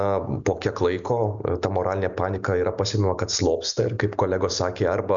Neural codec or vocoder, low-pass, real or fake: none; 7.2 kHz; real